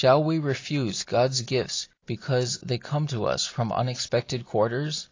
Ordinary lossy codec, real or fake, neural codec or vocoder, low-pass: AAC, 32 kbps; real; none; 7.2 kHz